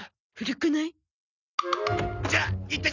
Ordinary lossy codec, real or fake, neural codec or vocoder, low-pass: none; real; none; 7.2 kHz